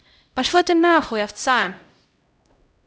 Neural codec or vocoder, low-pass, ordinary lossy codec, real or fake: codec, 16 kHz, 0.5 kbps, X-Codec, HuBERT features, trained on LibriSpeech; none; none; fake